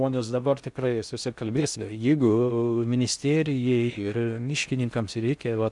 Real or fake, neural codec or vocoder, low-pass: fake; codec, 16 kHz in and 24 kHz out, 0.6 kbps, FocalCodec, streaming, 4096 codes; 10.8 kHz